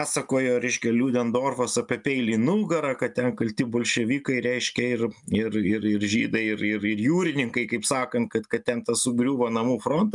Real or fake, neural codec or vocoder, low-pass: real; none; 10.8 kHz